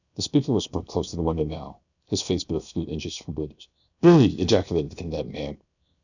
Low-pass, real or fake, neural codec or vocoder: 7.2 kHz; fake; codec, 16 kHz, 0.7 kbps, FocalCodec